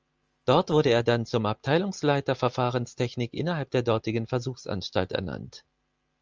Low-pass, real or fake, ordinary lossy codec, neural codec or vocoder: 7.2 kHz; real; Opus, 24 kbps; none